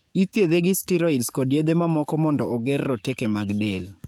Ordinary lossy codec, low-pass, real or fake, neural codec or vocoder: none; 19.8 kHz; fake; codec, 44.1 kHz, 7.8 kbps, DAC